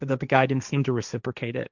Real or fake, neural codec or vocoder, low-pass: fake; codec, 16 kHz, 1.1 kbps, Voila-Tokenizer; 7.2 kHz